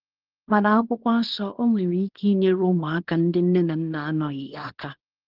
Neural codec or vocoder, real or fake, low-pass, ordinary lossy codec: codec, 24 kHz, 0.9 kbps, WavTokenizer, small release; fake; 5.4 kHz; Opus, 16 kbps